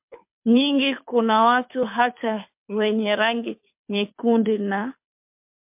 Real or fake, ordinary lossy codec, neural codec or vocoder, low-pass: fake; MP3, 32 kbps; codec, 24 kHz, 6 kbps, HILCodec; 3.6 kHz